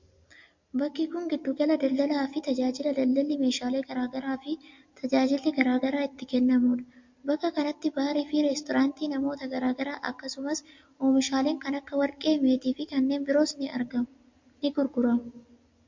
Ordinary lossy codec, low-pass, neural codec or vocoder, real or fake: MP3, 48 kbps; 7.2 kHz; none; real